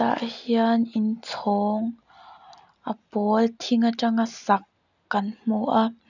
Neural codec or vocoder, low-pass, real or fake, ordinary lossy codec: none; 7.2 kHz; real; none